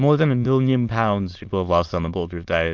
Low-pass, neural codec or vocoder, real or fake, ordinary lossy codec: 7.2 kHz; autoencoder, 22.05 kHz, a latent of 192 numbers a frame, VITS, trained on many speakers; fake; Opus, 24 kbps